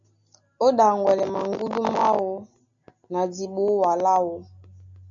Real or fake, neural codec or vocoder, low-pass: real; none; 7.2 kHz